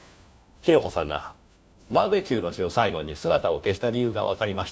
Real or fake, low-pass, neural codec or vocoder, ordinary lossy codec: fake; none; codec, 16 kHz, 1 kbps, FunCodec, trained on LibriTTS, 50 frames a second; none